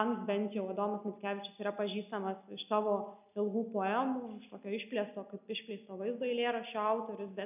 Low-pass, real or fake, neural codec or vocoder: 3.6 kHz; real; none